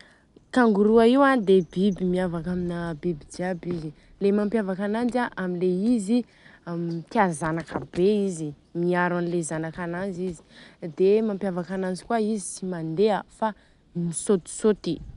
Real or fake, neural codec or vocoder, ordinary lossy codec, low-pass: real; none; none; 10.8 kHz